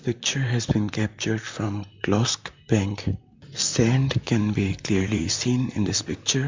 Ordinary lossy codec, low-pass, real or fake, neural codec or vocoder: MP3, 64 kbps; 7.2 kHz; fake; vocoder, 44.1 kHz, 128 mel bands, Pupu-Vocoder